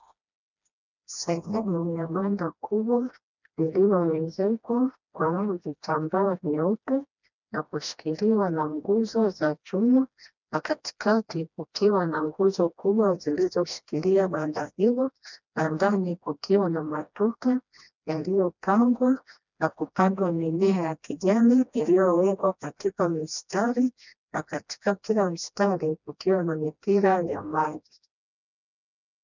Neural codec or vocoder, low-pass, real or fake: codec, 16 kHz, 1 kbps, FreqCodec, smaller model; 7.2 kHz; fake